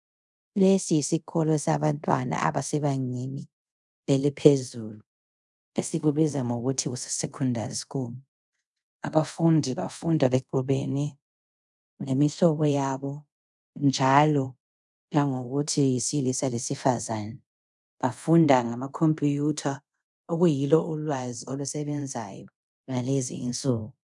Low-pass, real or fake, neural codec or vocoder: 10.8 kHz; fake; codec, 24 kHz, 0.5 kbps, DualCodec